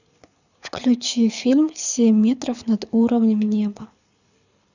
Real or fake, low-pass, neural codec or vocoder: fake; 7.2 kHz; codec, 24 kHz, 6 kbps, HILCodec